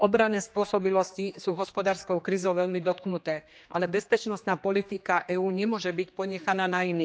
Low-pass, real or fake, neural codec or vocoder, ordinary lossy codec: none; fake; codec, 16 kHz, 2 kbps, X-Codec, HuBERT features, trained on general audio; none